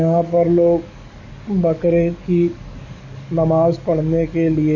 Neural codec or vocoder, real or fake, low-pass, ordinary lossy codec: codec, 44.1 kHz, 7.8 kbps, DAC; fake; 7.2 kHz; none